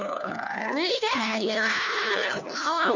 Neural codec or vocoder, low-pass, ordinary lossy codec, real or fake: codec, 16 kHz, 1 kbps, FunCodec, trained on LibriTTS, 50 frames a second; 7.2 kHz; none; fake